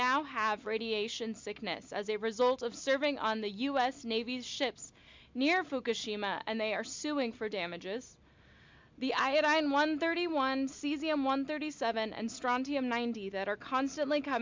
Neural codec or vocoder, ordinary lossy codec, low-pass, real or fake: none; MP3, 64 kbps; 7.2 kHz; real